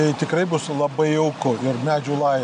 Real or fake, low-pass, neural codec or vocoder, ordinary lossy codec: real; 9.9 kHz; none; MP3, 96 kbps